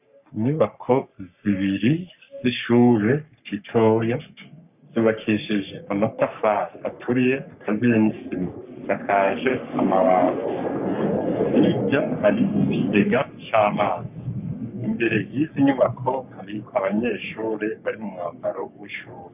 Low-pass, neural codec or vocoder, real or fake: 3.6 kHz; codec, 44.1 kHz, 3.4 kbps, Pupu-Codec; fake